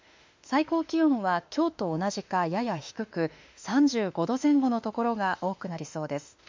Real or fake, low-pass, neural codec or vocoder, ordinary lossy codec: fake; 7.2 kHz; autoencoder, 48 kHz, 32 numbers a frame, DAC-VAE, trained on Japanese speech; MP3, 64 kbps